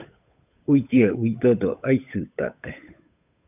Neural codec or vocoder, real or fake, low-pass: codec, 16 kHz, 8 kbps, FunCodec, trained on Chinese and English, 25 frames a second; fake; 3.6 kHz